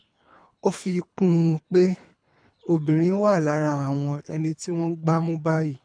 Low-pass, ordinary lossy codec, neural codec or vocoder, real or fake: 9.9 kHz; none; codec, 24 kHz, 3 kbps, HILCodec; fake